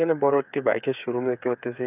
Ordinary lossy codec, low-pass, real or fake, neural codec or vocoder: none; 3.6 kHz; fake; codec, 16 kHz, 8 kbps, FreqCodec, smaller model